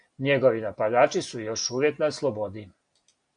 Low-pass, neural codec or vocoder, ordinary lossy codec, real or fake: 9.9 kHz; none; AAC, 64 kbps; real